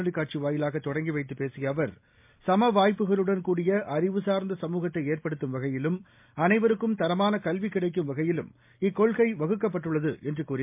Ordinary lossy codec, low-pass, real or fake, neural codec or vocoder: MP3, 32 kbps; 3.6 kHz; real; none